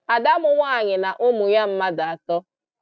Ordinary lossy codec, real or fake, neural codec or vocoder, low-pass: none; real; none; none